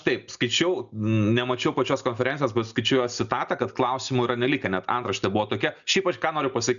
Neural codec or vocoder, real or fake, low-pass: none; real; 7.2 kHz